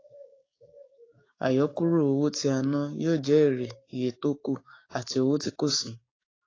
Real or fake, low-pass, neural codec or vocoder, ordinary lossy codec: fake; 7.2 kHz; codec, 16 kHz, 6 kbps, DAC; AAC, 32 kbps